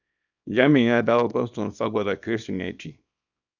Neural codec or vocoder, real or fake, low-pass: codec, 24 kHz, 0.9 kbps, WavTokenizer, small release; fake; 7.2 kHz